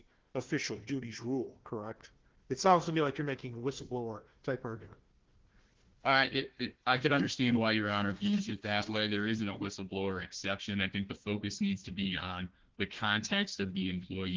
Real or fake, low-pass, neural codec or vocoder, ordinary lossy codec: fake; 7.2 kHz; codec, 16 kHz, 1 kbps, FunCodec, trained on Chinese and English, 50 frames a second; Opus, 16 kbps